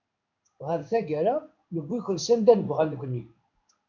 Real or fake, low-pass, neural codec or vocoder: fake; 7.2 kHz; codec, 16 kHz in and 24 kHz out, 1 kbps, XY-Tokenizer